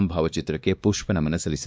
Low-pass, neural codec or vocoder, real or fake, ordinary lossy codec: none; codec, 16 kHz, 2 kbps, X-Codec, WavLM features, trained on Multilingual LibriSpeech; fake; none